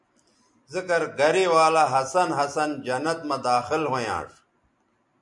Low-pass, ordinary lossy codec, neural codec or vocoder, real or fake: 10.8 kHz; MP3, 64 kbps; none; real